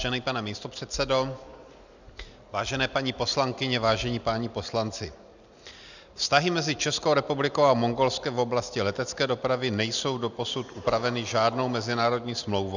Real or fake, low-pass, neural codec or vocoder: real; 7.2 kHz; none